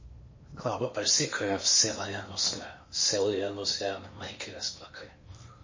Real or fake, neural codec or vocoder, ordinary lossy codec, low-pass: fake; codec, 16 kHz in and 24 kHz out, 0.8 kbps, FocalCodec, streaming, 65536 codes; MP3, 32 kbps; 7.2 kHz